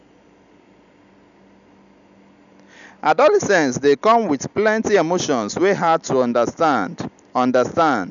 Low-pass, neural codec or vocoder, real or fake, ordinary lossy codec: 7.2 kHz; none; real; none